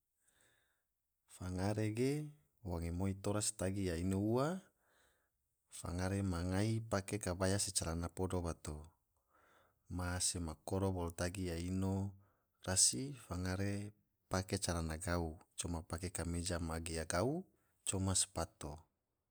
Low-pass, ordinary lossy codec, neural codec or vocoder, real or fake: none; none; none; real